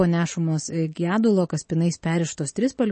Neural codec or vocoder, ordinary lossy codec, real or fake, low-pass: none; MP3, 32 kbps; real; 10.8 kHz